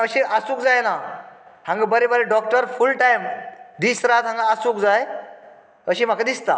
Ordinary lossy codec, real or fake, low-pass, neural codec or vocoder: none; real; none; none